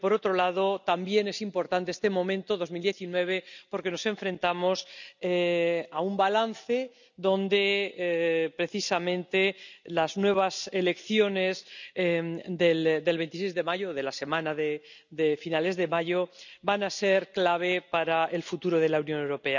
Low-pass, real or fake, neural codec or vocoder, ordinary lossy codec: 7.2 kHz; real; none; none